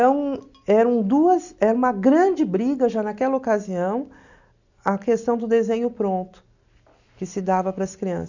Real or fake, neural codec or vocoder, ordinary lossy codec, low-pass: real; none; none; 7.2 kHz